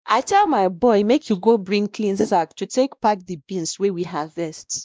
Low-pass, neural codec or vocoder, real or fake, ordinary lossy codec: none; codec, 16 kHz, 1 kbps, X-Codec, WavLM features, trained on Multilingual LibriSpeech; fake; none